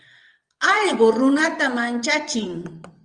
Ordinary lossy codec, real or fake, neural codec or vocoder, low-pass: Opus, 32 kbps; fake; vocoder, 22.05 kHz, 80 mel bands, WaveNeXt; 9.9 kHz